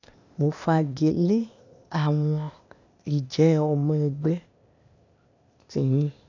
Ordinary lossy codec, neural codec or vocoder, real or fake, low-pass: none; codec, 16 kHz, 0.8 kbps, ZipCodec; fake; 7.2 kHz